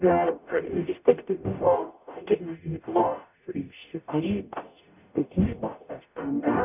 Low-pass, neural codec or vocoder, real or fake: 3.6 kHz; codec, 44.1 kHz, 0.9 kbps, DAC; fake